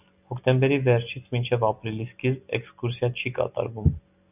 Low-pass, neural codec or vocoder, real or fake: 3.6 kHz; none; real